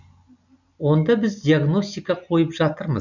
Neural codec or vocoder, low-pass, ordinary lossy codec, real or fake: none; 7.2 kHz; none; real